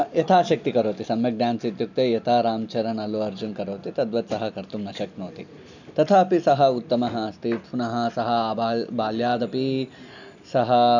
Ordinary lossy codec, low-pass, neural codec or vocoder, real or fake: none; 7.2 kHz; none; real